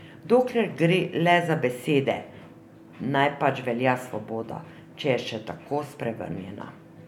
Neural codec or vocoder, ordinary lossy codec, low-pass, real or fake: none; none; 19.8 kHz; real